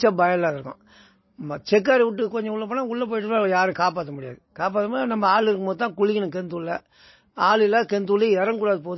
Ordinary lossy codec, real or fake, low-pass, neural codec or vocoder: MP3, 24 kbps; real; 7.2 kHz; none